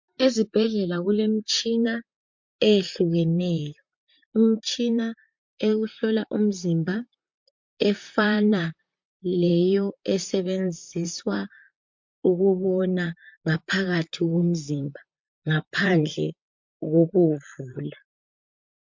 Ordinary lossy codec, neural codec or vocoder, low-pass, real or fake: MP3, 48 kbps; vocoder, 44.1 kHz, 128 mel bands, Pupu-Vocoder; 7.2 kHz; fake